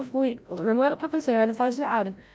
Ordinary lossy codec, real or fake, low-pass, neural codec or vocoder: none; fake; none; codec, 16 kHz, 0.5 kbps, FreqCodec, larger model